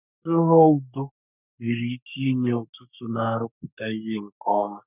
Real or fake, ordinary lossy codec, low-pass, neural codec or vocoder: fake; none; 3.6 kHz; codec, 44.1 kHz, 3.4 kbps, Pupu-Codec